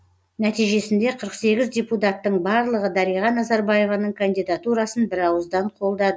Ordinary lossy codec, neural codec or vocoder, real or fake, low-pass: none; none; real; none